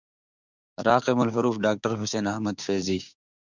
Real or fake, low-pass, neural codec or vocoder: fake; 7.2 kHz; codec, 16 kHz, 6 kbps, DAC